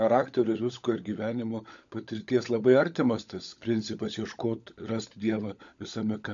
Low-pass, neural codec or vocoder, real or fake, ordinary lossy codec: 7.2 kHz; codec, 16 kHz, 16 kbps, FunCodec, trained on LibriTTS, 50 frames a second; fake; MP3, 64 kbps